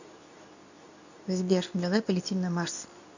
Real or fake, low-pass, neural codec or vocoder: fake; 7.2 kHz; codec, 24 kHz, 0.9 kbps, WavTokenizer, medium speech release version 2